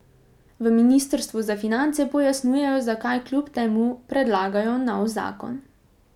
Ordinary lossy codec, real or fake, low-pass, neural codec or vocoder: none; real; 19.8 kHz; none